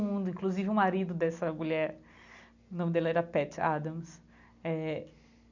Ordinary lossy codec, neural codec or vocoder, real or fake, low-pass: none; none; real; 7.2 kHz